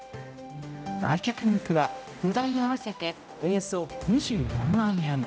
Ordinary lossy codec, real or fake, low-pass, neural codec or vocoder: none; fake; none; codec, 16 kHz, 0.5 kbps, X-Codec, HuBERT features, trained on general audio